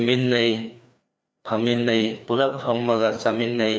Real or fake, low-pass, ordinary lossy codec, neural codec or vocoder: fake; none; none; codec, 16 kHz, 2 kbps, FreqCodec, larger model